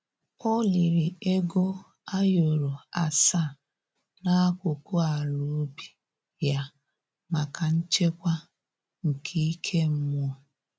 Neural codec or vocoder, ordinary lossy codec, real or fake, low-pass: none; none; real; none